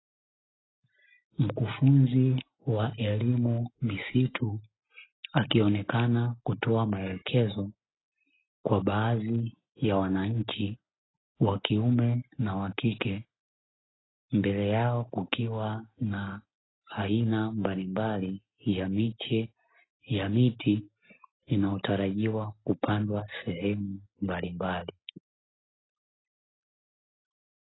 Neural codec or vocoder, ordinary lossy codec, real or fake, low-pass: none; AAC, 16 kbps; real; 7.2 kHz